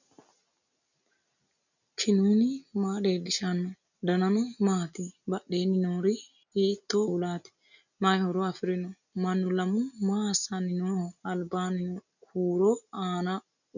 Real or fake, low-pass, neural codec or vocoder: real; 7.2 kHz; none